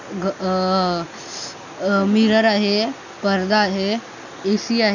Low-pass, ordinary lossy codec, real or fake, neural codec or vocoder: 7.2 kHz; none; real; none